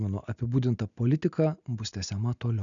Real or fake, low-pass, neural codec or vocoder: real; 7.2 kHz; none